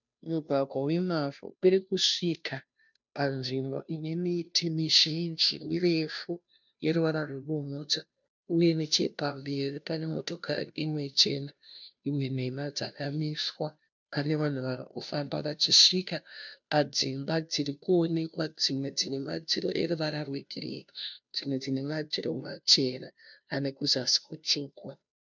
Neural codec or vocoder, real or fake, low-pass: codec, 16 kHz, 0.5 kbps, FunCodec, trained on Chinese and English, 25 frames a second; fake; 7.2 kHz